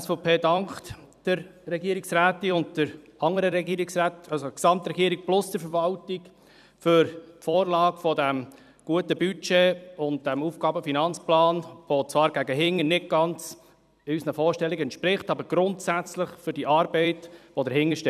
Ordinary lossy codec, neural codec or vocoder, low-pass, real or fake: none; vocoder, 44.1 kHz, 128 mel bands every 256 samples, BigVGAN v2; 14.4 kHz; fake